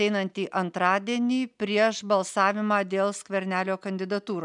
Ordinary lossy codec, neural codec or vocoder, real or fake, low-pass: MP3, 96 kbps; none; real; 10.8 kHz